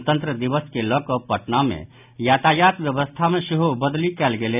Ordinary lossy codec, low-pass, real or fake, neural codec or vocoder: none; 3.6 kHz; real; none